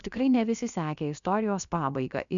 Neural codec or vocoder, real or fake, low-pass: codec, 16 kHz, about 1 kbps, DyCAST, with the encoder's durations; fake; 7.2 kHz